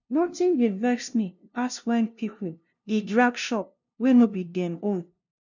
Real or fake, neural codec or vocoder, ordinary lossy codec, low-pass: fake; codec, 16 kHz, 0.5 kbps, FunCodec, trained on LibriTTS, 25 frames a second; none; 7.2 kHz